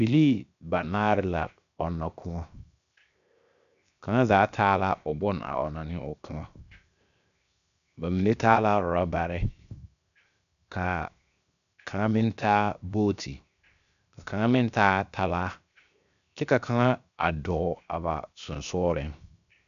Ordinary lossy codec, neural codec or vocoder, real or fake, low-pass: MP3, 96 kbps; codec, 16 kHz, 0.7 kbps, FocalCodec; fake; 7.2 kHz